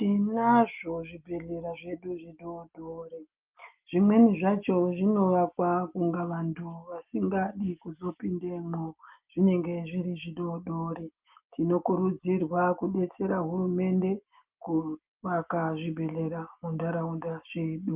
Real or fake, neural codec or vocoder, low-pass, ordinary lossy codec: real; none; 3.6 kHz; Opus, 32 kbps